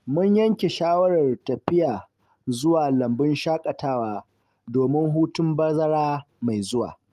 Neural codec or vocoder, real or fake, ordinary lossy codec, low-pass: none; real; Opus, 32 kbps; 14.4 kHz